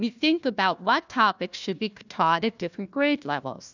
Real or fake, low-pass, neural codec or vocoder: fake; 7.2 kHz; codec, 16 kHz, 1 kbps, FunCodec, trained on Chinese and English, 50 frames a second